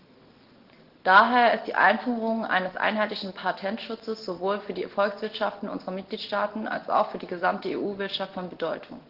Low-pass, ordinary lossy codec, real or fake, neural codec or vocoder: 5.4 kHz; Opus, 16 kbps; real; none